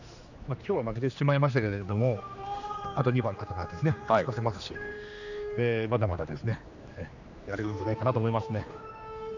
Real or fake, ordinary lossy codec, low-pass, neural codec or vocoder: fake; none; 7.2 kHz; codec, 16 kHz, 2 kbps, X-Codec, HuBERT features, trained on general audio